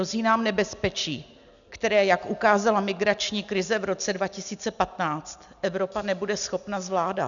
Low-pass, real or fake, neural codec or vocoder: 7.2 kHz; real; none